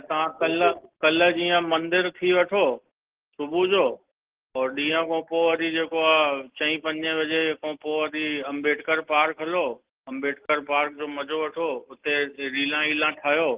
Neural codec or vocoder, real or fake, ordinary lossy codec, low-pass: none; real; Opus, 16 kbps; 3.6 kHz